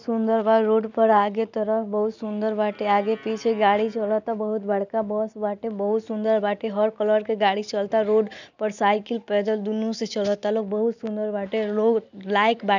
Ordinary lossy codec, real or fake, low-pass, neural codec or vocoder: none; real; 7.2 kHz; none